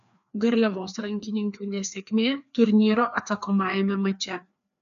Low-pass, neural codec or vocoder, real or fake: 7.2 kHz; codec, 16 kHz, 2 kbps, FreqCodec, larger model; fake